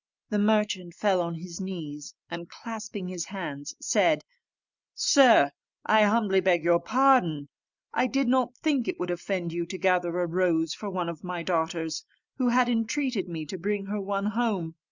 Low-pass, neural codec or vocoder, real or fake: 7.2 kHz; none; real